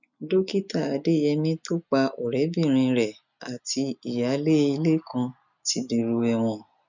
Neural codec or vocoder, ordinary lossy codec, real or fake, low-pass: none; none; real; 7.2 kHz